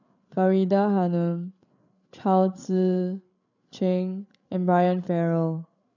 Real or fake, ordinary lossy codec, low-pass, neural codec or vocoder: fake; none; 7.2 kHz; codec, 16 kHz, 8 kbps, FreqCodec, larger model